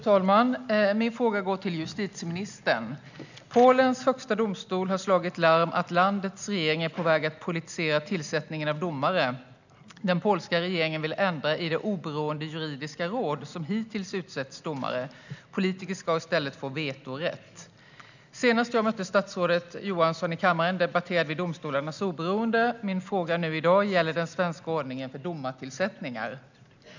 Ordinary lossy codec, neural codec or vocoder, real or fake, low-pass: none; none; real; 7.2 kHz